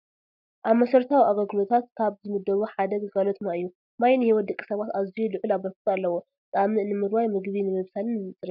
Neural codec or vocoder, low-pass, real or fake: none; 5.4 kHz; real